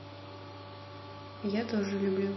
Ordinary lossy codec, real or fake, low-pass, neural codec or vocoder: MP3, 24 kbps; real; 7.2 kHz; none